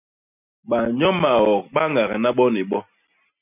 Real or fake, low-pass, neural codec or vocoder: real; 3.6 kHz; none